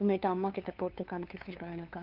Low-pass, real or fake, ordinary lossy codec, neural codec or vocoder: 5.4 kHz; fake; Opus, 32 kbps; codec, 16 kHz, 2 kbps, FunCodec, trained on LibriTTS, 25 frames a second